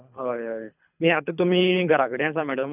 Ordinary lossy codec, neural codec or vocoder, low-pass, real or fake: none; codec, 24 kHz, 3 kbps, HILCodec; 3.6 kHz; fake